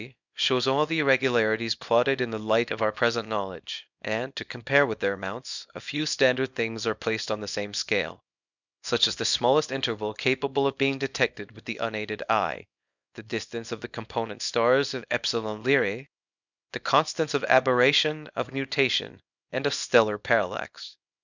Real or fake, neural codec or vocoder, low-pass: fake; codec, 24 kHz, 0.9 kbps, WavTokenizer, small release; 7.2 kHz